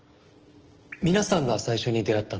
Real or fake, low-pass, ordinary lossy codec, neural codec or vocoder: real; 7.2 kHz; Opus, 16 kbps; none